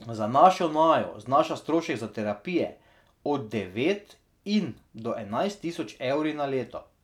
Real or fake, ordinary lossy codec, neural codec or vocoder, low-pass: real; none; none; 19.8 kHz